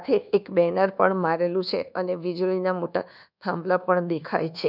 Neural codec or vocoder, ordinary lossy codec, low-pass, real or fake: codec, 24 kHz, 1.2 kbps, DualCodec; none; 5.4 kHz; fake